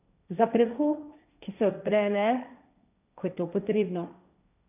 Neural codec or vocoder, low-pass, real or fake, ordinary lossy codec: codec, 16 kHz, 1.1 kbps, Voila-Tokenizer; 3.6 kHz; fake; none